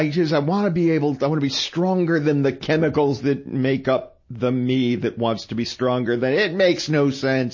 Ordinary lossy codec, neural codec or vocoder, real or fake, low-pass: MP3, 32 kbps; none; real; 7.2 kHz